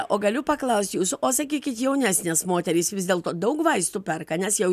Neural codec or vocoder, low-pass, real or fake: none; 14.4 kHz; real